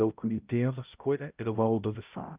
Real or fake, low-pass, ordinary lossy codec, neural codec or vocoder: fake; 3.6 kHz; Opus, 24 kbps; codec, 16 kHz, 0.5 kbps, X-Codec, HuBERT features, trained on balanced general audio